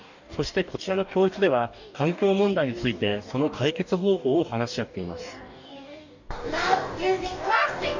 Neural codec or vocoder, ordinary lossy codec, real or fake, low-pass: codec, 44.1 kHz, 2.6 kbps, DAC; none; fake; 7.2 kHz